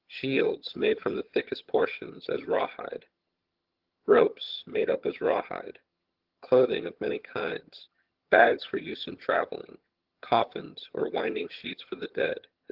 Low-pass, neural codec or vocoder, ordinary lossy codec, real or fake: 5.4 kHz; vocoder, 22.05 kHz, 80 mel bands, HiFi-GAN; Opus, 16 kbps; fake